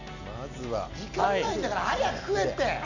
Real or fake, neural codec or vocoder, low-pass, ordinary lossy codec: real; none; 7.2 kHz; none